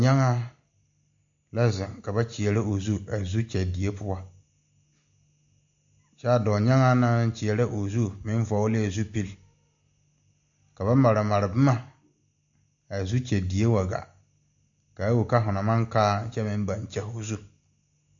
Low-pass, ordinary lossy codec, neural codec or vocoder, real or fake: 7.2 kHz; AAC, 64 kbps; none; real